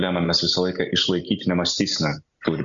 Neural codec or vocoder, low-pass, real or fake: none; 7.2 kHz; real